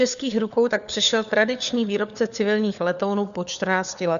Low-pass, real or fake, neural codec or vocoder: 7.2 kHz; fake; codec, 16 kHz, 4 kbps, FunCodec, trained on LibriTTS, 50 frames a second